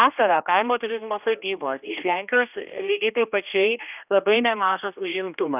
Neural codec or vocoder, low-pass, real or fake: codec, 16 kHz, 1 kbps, X-Codec, HuBERT features, trained on general audio; 3.6 kHz; fake